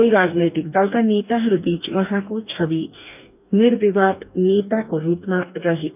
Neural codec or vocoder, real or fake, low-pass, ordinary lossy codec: codec, 44.1 kHz, 2.6 kbps, DAC; fake; 3.6 kHz; none